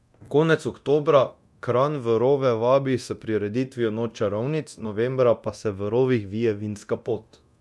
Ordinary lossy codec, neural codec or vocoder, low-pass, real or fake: none; codec, 24 kHz, 0.9 kbps, DualCodec; none; fake